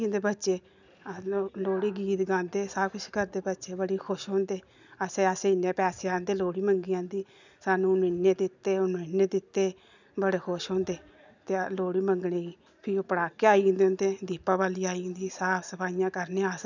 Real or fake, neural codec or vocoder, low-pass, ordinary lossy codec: real; none; 7.2 kHz; none